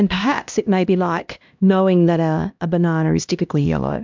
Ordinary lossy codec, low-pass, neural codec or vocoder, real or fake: MP3, 64 kbps; 7.2 kHz; codec, 16 kHz, 1 kbps, X-Codec, HuBERT features, trained on LibriSpeech; fake